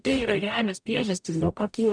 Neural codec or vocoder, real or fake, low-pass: codec, 44.1 kHz, 0.9 kbps, DAC; fake; 9.9 kHz